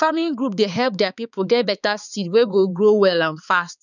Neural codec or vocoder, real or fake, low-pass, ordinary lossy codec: codec, 16 kHz, 4 kbps, X-Codec, HuBERT features, trained on balanced general audio; fake; 7.2 kHz; none